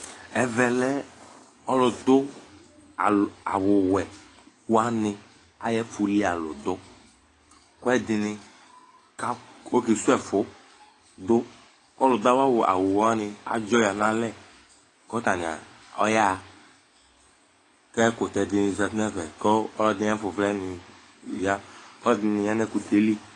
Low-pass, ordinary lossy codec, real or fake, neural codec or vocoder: 10.8 kHz; AAC, 32 kbps; fake; codec, 44.1 kHz, 7.8 kbps, DAC